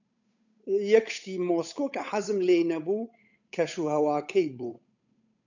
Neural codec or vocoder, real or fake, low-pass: codec, 16 kHz, 8 kbps, FunCodec, trained on Chinese and English, 25 frames a second; fake; 7.2 kHz